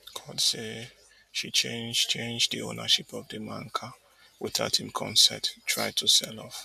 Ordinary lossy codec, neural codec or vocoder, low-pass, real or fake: none; none; 14.4 kHz; real